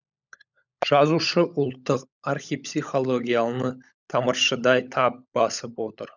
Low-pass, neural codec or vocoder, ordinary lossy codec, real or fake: 7.2 kHz; codec, 16 kHz, 16 kbps, FunCodec, trained on LibriTTS, 50 frames a second; none; fake